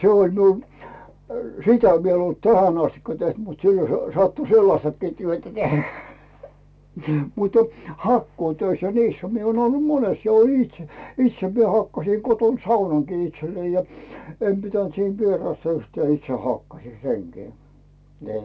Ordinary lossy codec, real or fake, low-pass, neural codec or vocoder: none; real; none; none